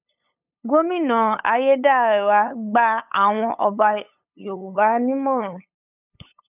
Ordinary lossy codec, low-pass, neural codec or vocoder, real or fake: none; 3.6 kHz; codec, 16 kHz, 8 kbps, FunCodec, trained on LibriTTS, 25 frames a second; fake